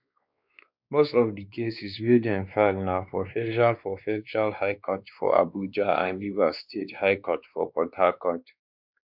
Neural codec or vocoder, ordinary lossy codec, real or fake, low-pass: codec, 16 kHz, 2 kbps, X-Codec, WavLM features, trained on Multilingual LibriSpeech; none; fake; 5.4 kHz